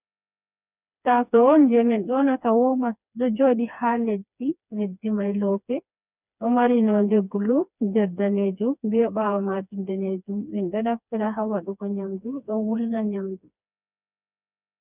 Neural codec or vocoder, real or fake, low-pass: codec, 16 kHz, 2 kbps, FreqCodec, smaller model; fake; 3.6 kHz